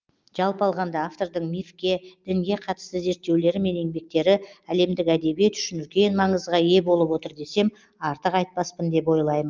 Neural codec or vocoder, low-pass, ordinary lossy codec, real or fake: none; 7.2 kHz; Opus, 24 kbps; real